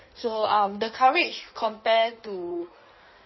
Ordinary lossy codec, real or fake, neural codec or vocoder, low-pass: MP3, 24 kbps; fake; codec, 16 kHz in and 24 kHz out, 1.1 kbps, FireRedTTS-2 codec; 7.2 kHz